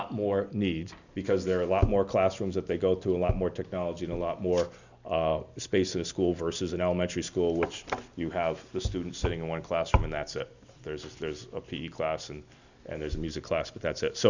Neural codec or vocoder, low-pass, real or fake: none; 7.2 kHz; real